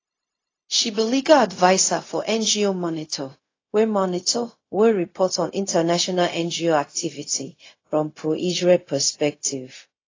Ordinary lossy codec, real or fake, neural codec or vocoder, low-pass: AAC, 32 kbps; fake; codec, 16 kHz, 0.4 kbps, LongCat-Audio-Codec; 7.2 kHz